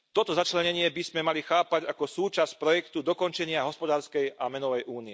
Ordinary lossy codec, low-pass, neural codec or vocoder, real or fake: none; none; none; real